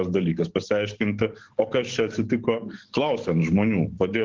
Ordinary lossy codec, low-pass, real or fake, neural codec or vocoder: Opus, 16 kbps; 7.2 kHz; fake; codec, 44.1 kHz, 7.8 kbps, DAC